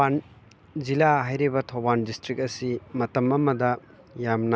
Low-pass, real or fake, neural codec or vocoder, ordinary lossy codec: none; real; none; none